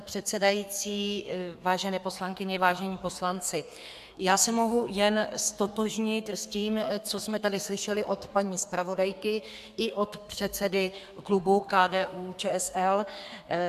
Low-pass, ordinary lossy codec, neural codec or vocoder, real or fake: 14.4 kHz; AAC, 96 kbps; codec, 32 kHz, 1.9 kbps, SNAC; fake